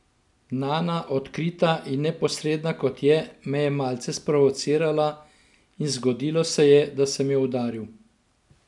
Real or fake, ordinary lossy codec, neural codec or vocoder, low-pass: real; none; none; 10.8 kHz